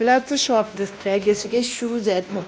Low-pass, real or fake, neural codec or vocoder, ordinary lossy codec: none; fake; codec, 16 kHz, 1 kbps, X-Codec, WavLM features, trained on Multilingual LibriSpeech; none